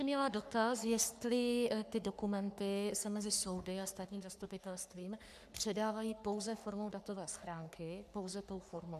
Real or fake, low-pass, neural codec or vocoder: fake; 14.4 kHz; codec, 44.1 kHz, 3.4 kbps, Pupu-Codec